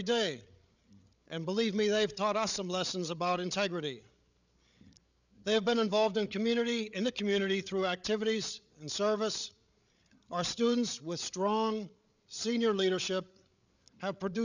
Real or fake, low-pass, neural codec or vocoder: fake; 7.2 kHz; codec, 16 kHz, 8 kbps, FreqCodec, larger model